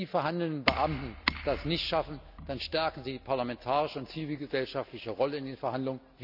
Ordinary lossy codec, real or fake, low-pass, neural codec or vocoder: none; real; 5.4 kHz; none